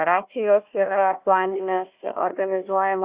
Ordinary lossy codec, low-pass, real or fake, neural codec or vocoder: Opus, 64 kbps; 3.6 kHz; fake; codec, 16 kHz, 1 kbps, FunCodec, trained on Chinese and English, 50 frames a second